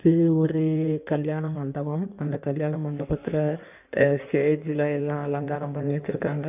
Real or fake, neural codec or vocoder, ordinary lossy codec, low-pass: fake; codec, 16 kHz in and 24 kHz out, 1.1 kbps, FireRedTTS-2 codec; none; 3.6 kHz